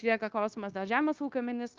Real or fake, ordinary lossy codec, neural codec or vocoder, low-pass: fake; Opus, 24 kbps; codec, 16 kHz, 0.9 kbps, LongCat-Audio-Codec; 7.2 kHz